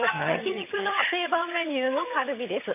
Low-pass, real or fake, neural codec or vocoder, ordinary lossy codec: 3.6 kHz; fake; vocoder, 22.05 kHz, 80 mel bands, HiFi-GAN; none